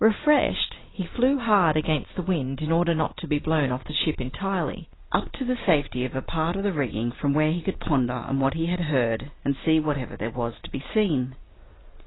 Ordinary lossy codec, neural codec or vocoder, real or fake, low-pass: AAC, 16 kbps; none; real; 7.2 kHz